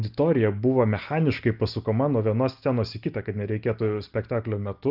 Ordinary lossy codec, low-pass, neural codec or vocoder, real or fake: Opus, 24 kbps; 5.4 kHz; none; real